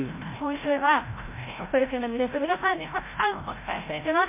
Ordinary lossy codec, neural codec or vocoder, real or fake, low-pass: MP3, 24 kbps; codec, 16 kHz, 0.5 kbps, FreqCodec, larger model; fake; 3.6 kHz